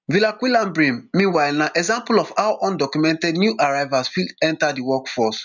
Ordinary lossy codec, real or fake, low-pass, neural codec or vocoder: none; real; 7.2 kHz; none